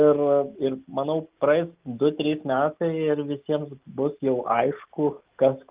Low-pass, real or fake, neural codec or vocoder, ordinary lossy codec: 3.6 kHz; real; none; Opus, 24 kbps